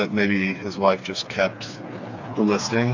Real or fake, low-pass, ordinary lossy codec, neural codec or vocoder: fake; 7.2 kHz; AAC, 48 kbps; codec, 16 kHz, 4 kbps, FreqCodec, smaller model